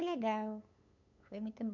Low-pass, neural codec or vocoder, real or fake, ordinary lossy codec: 7.2 kHz; codec, 16 kHz, 8 kbps, FunCodec, trained on LibriTTS, 25 frames a second; fake; none